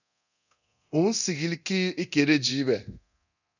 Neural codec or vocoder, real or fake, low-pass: codec, 24 kHz, 0.9 kbps, DualCodec; fake; 7.2 kHz